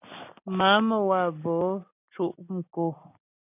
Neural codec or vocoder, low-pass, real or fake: none; 3.6 kHz; real